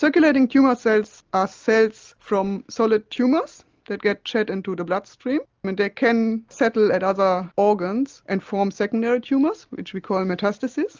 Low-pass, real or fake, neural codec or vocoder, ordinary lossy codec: 7.2 kHz; real; none; Opus, 24 kbps